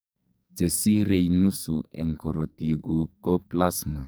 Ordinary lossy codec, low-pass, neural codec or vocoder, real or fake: none; none; codec, 44.1 kHz, 2.6 kbps, SNAC; fake